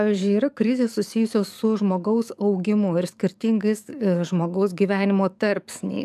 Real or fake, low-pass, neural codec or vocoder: fake; 14.4 kHz; codec, 44.1 kHz, 7.8 kbps, DAC